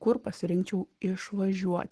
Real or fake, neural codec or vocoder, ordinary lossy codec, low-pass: fake; codec, 44.1 kHz, 7.8 kbps, Pupu-Codec; Opus, 16 kbps; 10.8 kHz